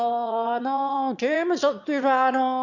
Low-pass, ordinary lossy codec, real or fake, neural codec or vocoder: 7.2 kHz; none; fake; autoencoder, 22.05 kHz, a latent of 192 numbers a frame, VITS, trained on one speaker